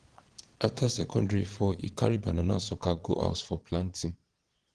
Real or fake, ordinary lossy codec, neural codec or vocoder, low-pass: fake; Opus, 16 kbps; vocoder, 22.05 kHz, 80 mel bands, Vocos; 9.9 kHz